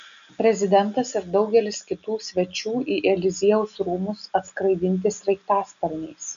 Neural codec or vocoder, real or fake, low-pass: none; real; 7.2 kHz